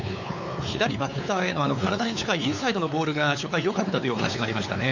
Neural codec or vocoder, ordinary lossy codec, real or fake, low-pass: codec, 16 kHz, 4 kbps, X-Codec, WavLM features, trained on Multilingual LibriSpeech; none; fake; 7.2 kHz